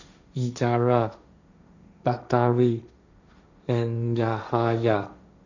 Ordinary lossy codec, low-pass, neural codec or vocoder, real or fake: none; 7.2 kHz; codec, 16 kHz, 1.1 kbps, Voila-Tokenizer; fake